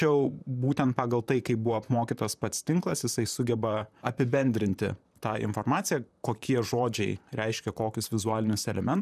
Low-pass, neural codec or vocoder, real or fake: 14.4 kHz; vocoder, 44.1 kHz, 128 mel bands, Pupu-Vocoder; fake